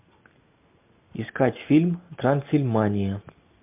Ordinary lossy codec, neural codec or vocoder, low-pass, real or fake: MP3, 32 kbps; none; 3.6 kHz; real